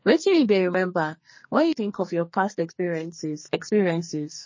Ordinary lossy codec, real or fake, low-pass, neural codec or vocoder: MP3, 32 kbps; fake; 7.2 kHz; codec, 44.1 kHz, 2.6 kbps, SNAC